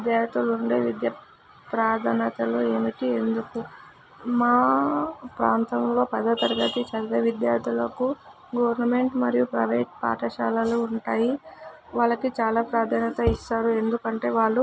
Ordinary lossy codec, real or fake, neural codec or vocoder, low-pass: none; real; none; none